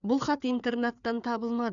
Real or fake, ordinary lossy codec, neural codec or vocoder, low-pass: fake; none; codec, 16 kHz, 4 kbps, FreqCodec, larger model; 7.2 kHz